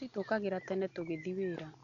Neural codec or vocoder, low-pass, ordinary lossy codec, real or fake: none; 7.2 kHz; none; real